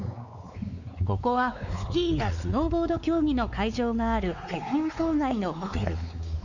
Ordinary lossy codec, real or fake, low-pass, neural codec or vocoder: none; fake; 7.2 kHz; codec, 16 kHz, 4 kbps, X-Codec, WavLM features, trained on Multilingual LibriSpeech